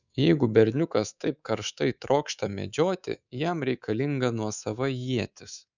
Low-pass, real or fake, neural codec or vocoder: 7.2 kHz; fake; codec, 24 kHz, 3.1 kbps, DualCodec